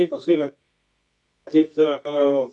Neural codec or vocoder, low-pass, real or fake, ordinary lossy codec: codec, 24 kHz, 0.9 kbps, WavTokenizer, medium music audio release; 10.8 kHz; fake; MP3, 96 kbps